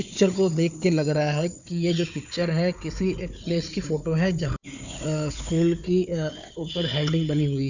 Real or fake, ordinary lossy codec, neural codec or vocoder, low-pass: fake; none; codec, 16 kHz, 4 kbps, FunCodec, trained on Chinese and English, 50 frames a second; 7.2 kHz